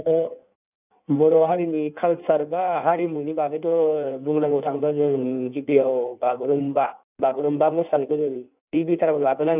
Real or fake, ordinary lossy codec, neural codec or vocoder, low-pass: fake; none; codec, 16 kHz in and 24 kHz out, 1.1 kbps, FireRedTTS-2 codec; 3.6 kHz